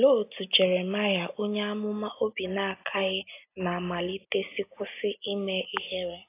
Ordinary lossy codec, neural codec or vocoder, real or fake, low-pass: AAC, 24 kbps; none; real; 3.6 kHz